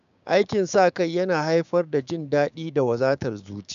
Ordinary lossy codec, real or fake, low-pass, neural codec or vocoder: AAC, 96 kbps; fake; 7.2 kHz; codec, 16 kHz, 6 kbps, DAC